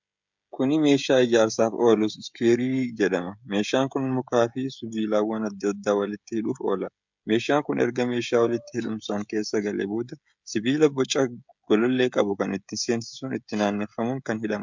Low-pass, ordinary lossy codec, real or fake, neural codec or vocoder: 7.2 kHz; MP3, 64 kbps; fake; codec, 16 kHz, 16 kbps, FreqCodec, smaller model